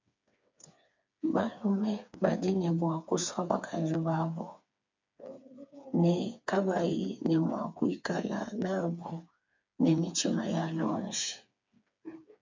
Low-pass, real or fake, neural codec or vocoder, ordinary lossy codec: 7.2 kHz; fake; codec, 16 kHz, 4 kbps, FreqCodec, smaller model; MP3, 64 kbps